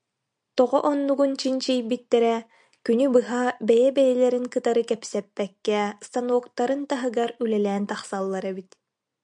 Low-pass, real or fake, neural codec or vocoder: 9.9 kHz; real; none